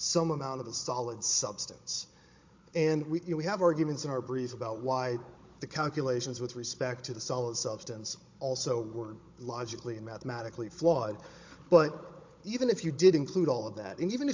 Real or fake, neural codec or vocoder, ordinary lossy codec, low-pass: fake; codec, 16 kHz, 16 kbps, FunCodec, trained on Chinese and English, 50 frames a second; MP3, 48 kbps; 7.2 kHz